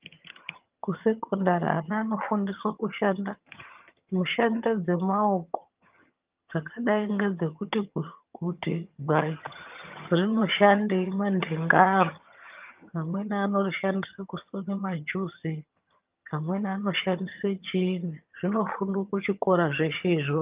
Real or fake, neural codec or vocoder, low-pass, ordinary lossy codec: fake; vocoder, 22.05 kHz, 80 mel bands, HiFi-GAN; 3.6 kHz; Opus, 24 kbps